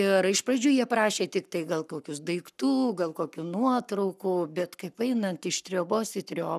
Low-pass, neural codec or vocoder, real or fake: 14.4 kHz; codec, 44.1 kHz, 7.8 kbps, Pupu-Codec; fake